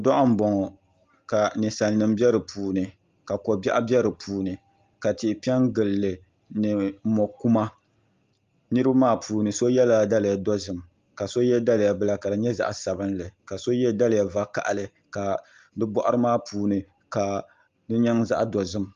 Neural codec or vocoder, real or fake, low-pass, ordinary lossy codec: none; real; 7.2 kHz; Opus, 32 kbps